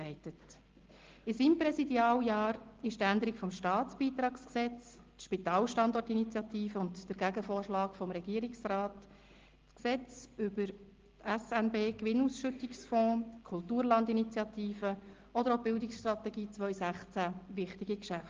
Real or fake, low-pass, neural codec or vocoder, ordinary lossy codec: real; 7.2 kHz; none; Opus, 16 kbps